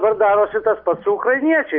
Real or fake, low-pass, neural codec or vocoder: real; 5.4 kHz; none